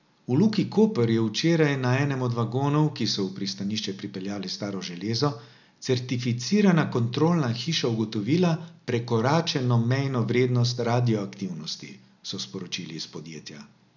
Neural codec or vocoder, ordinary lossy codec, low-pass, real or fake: none; none; 7.2 kHz; real